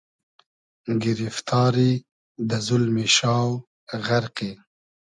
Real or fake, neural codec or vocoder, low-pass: real; none; 10.8 kHz